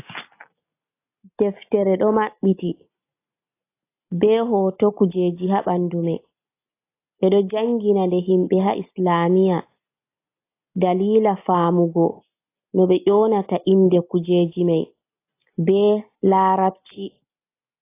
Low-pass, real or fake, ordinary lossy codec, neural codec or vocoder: 3.6 kHz; real; AAC, 24 kbps; none